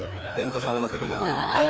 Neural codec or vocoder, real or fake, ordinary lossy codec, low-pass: codec, 16 kHz, 2 kbps, FreqCodec, larger model; fake; none; none